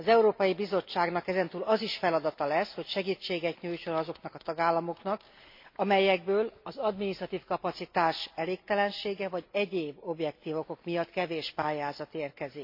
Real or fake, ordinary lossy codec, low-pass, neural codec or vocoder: real; MP3, 32 kbps; 5.4 kHz; none